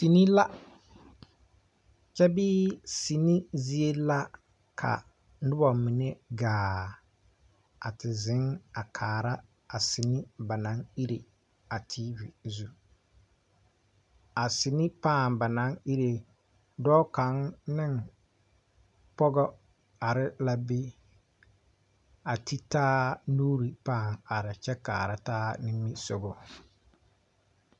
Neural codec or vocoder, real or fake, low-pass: none; real; 10.8 kHz